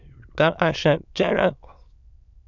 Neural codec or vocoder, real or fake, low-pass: autoencoder, 22.05 kHz, a latent of 192 numbers a frame, VITS, trained on many speakers; fake; 7.2 kHz